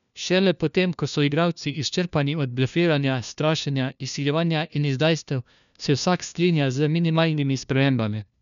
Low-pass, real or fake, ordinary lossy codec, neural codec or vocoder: 7.2 kHz; fake; none; codec, 16 kHz, 1 kbps, FunCodec, trained on LibriTTS, 50 frames a second